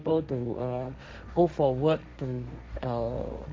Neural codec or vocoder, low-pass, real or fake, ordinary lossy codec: codec, 16 kHz, 1.1 kbps, Voila-Tokenizer; none; fake; none